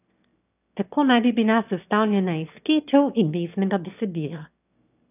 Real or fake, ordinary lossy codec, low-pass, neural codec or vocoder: fake; none; 3.6 kHz; autoencoder, 22.05 kHz, a latent of 192 numbers a frame, VITS, trained on one speaker